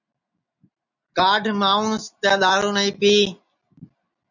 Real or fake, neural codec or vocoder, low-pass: real; none; 7.2 kHz